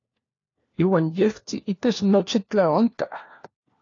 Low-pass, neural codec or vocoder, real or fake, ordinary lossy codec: 7.2 kHz; codec, 16 kHz, 1 kbps, FunCodec, trained on LibriTTS, 50 frames a second; fake; AAC, 32 kbps